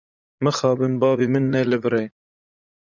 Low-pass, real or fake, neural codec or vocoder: 7.2 kHz; fake; vocoder, 44.1 kHz, 80 mel bands, Vocos